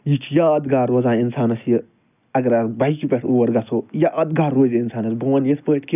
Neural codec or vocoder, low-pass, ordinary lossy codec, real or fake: none; 3.6 kHz; none; real